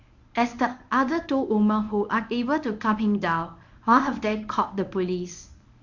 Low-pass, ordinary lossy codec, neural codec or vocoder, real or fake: 7.2 kHz; none; codec, 24 kHz, 0.9 kbps, WavTokenizer, medium speech release version 1; fake